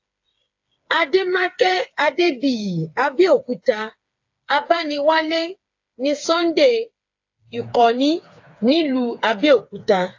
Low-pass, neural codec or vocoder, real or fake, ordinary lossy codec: 7.2 kHz; codec, 16 kHz, 4 kbps, FreqCodec, smaller model; fake; AAC, 48 kbps